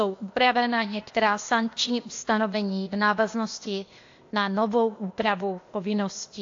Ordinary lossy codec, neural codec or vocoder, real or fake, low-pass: AAC, 48 kbps; codec, 16 kHz, 0.8 kbps, ZipCodec; fake; 7.2 kHz